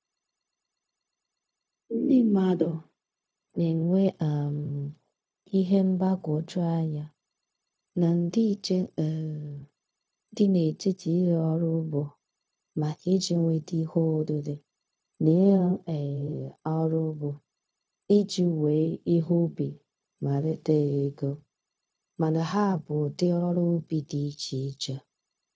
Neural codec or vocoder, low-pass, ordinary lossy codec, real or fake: codec, 16 kHz, 0.4 kbps, LongCat-Audio-Codec; none; none; fake